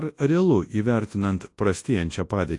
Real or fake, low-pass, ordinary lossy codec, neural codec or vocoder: fake; 10.8 kHz; AAC, 48 kbps; codec, 24 kHz, 0.9 kbps, WavTokenizer, large speech release